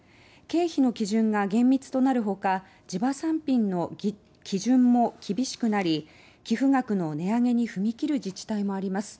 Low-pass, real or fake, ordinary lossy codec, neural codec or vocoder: none; real; none; none